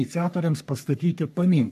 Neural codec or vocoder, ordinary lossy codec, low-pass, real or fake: codec, 44.1 kHz, 3.4 kbps, Pupu-Codec; AAC, 96 kbps; 14.4 kHz; fake